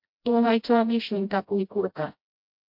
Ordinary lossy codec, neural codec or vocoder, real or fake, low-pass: MP3, 48 kbps; codec, 16 kHz, 0.5 kbps, FreqCodec, smaller model; fake; 5.4 kHz